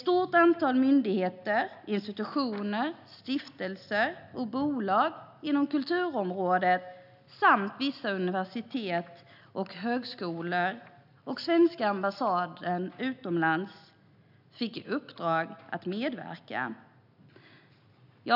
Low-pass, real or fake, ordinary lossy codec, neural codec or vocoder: 5.4 kHz; real; none; none